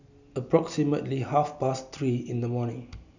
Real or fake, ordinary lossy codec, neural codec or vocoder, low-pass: real; none; none; 7.2 kHz